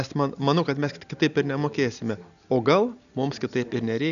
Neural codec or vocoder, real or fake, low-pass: none; real; 7.2 kHz